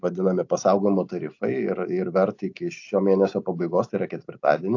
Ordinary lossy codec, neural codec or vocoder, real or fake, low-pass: AAC, 48 kbps; none; real; 7.2 kHz